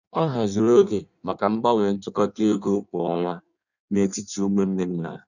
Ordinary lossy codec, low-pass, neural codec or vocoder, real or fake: none; 7.2 kHz; codec, 16 kHz in and 24 kHz out, 1.1 kbps, FireRedTTS-2 codec; fake